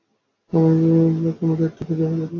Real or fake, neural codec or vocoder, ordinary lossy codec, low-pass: real; none; AAC, 32 kbps; 7.2 kHz